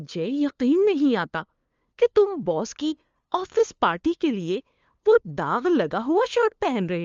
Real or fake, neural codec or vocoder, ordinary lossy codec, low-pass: fake; codec, 16 kHz, 2 kbps, FunCodec, trained on LibriTTS, 25 frames a second; Opus, 32 kbps; 7.2 kHz